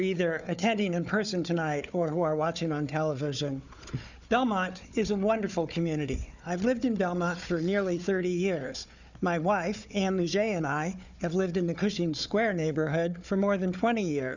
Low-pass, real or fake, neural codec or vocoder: 7.2 kHz; fake; codec, 16 kHz, 4 kbps, FunCodec, trained on Chinese and English, 50 frames a second